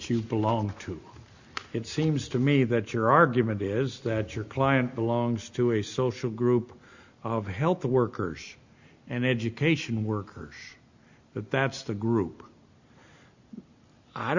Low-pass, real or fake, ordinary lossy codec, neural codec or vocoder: 7.2 kHz; real; Opus, 64 kbps; none